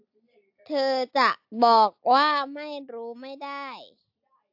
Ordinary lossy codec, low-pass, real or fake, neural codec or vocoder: AAC, 48 kbps; 5.4 kHz; real; none